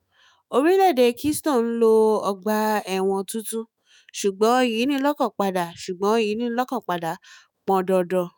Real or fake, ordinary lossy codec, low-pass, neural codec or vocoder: fake; none; none; autoencoder, 48 kHz, 128 numbers a frame, DAC-VAE, trained on Japanese speech